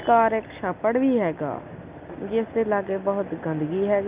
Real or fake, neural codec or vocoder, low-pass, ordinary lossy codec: real; none; 3.6 kHz; Opus, 24 kbps